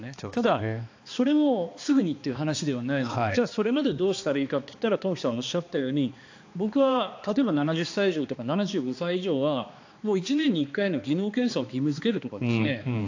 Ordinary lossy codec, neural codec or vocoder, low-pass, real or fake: AAC, 48 kbps; codec, 16 kHz, 2 kbps, X-Codec, HuBERT features, trained on balanced general audio; 7.2 kHz; fake